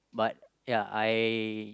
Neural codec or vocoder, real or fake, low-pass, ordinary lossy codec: none; real; none; none